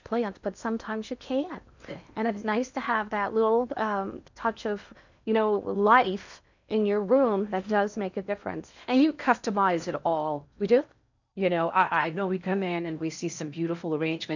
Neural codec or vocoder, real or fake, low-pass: codec, 16 kHz in and 24 kHz out, 0.8 kbps, FocalCodec, streaming, 65536 codes; fake; 7.2 kHz